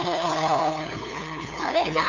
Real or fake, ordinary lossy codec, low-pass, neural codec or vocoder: fake; none; 7.2 kHz; codec, 16 kHz, 2 kbps, FunCodec, trained on LibriTTS, 25 frames a second